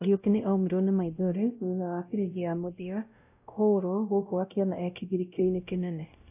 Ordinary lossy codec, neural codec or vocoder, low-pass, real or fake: AAC, 32 kbps; codec, 16 kHz, 0.5 kbps, X-Codec, WavLM features, trained on Multilingual LibriSpeech; 3.6 kHz; fake